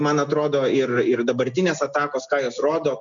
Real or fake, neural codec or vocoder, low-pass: real; none; 7.2 kHz